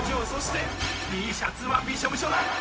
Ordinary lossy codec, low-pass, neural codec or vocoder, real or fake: none; none; codec, 16 kHz, 0.4 kbps, LongCat-Audio-Codec; fake